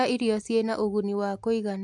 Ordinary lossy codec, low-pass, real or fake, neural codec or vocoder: MP3, 64 kbps; 10.8 kHz; real; none